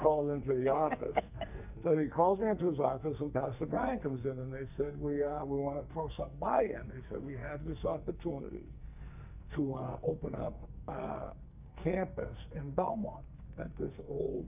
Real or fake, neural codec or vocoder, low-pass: fake; codec, 44.1 kHz, 2.6 kbps, SNAC; 3.6 kHz